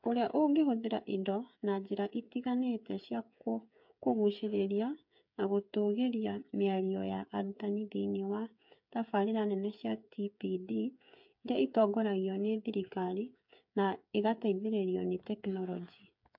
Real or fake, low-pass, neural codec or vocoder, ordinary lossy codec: fake; 5.4 kHz; codec, 16 kHz, 8 kbps, FreqCodec, smaller model; MP3, 48 kbps